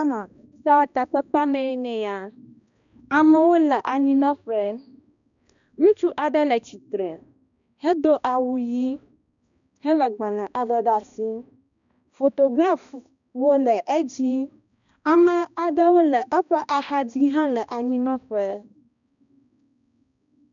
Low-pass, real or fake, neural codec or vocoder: 7.2 kHz; fake; codec, 16 kHz, 1 kbps, X-Codec, HuBERT features, trained on balanced general audio